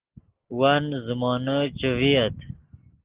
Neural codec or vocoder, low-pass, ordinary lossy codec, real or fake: none; 3.6 kHz; Opus, 16 kbps; real